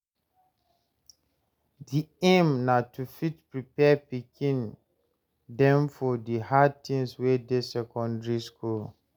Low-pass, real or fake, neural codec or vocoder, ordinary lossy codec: none; real; none; none